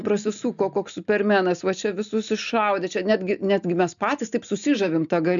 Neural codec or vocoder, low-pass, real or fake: none; 7.2 kHz; real